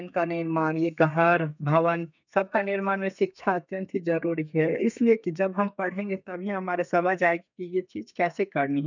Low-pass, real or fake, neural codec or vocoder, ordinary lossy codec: 7.2 kHz; fake; codec, 32 kHz, 1.9 kbps, SNAC; none